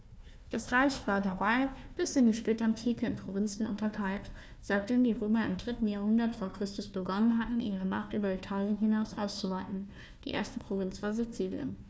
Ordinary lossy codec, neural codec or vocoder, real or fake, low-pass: none; codec, 16 kHz, 1 kbps, FunCodec, trained on Chinese and English, 50 frames a second; fake; none